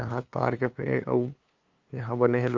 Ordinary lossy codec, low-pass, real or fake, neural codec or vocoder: Opus, 32 kbps; 7.2 kHz; fake; codec, 16 kHz, 2 kbps, FunCodec, trained on LibriTTS, 25 frames a second